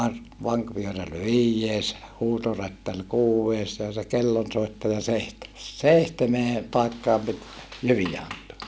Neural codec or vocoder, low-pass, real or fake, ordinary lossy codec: none; none; real; none